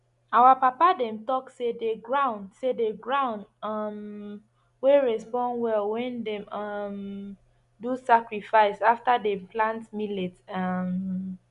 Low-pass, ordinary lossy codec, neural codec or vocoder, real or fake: 10.8 kHz; none; none; real